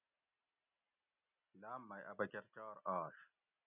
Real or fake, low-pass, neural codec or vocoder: real; 3.6 kHz; none